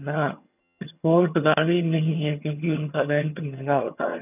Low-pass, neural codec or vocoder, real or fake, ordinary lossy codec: 3.6 kHz; vocoder, 22.05 kHz, 80 mel bands, HiFi-GAN; fake; none